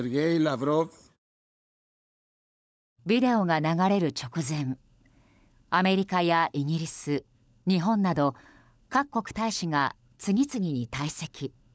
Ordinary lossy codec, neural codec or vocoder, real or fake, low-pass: none; codec, 16 kHz, 16 kbps, FunCodec, trained on LibriTTS, 50 frames a second; fake; none